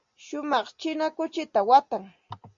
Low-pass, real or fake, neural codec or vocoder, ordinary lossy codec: 7.2 kHz; real; none; MP3, 64 kbps